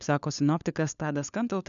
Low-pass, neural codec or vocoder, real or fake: 7.2 kHz; codec, 16 kHz, 4 kbps, FunCodec, trained on LibriTTS, 50 frames a second; fake